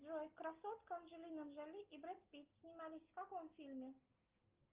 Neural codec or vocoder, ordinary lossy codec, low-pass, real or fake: none; Opus, 32 kbps; 3.6 kHz; real